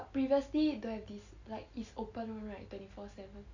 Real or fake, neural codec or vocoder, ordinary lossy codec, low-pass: real; none; none; 7.2 kHz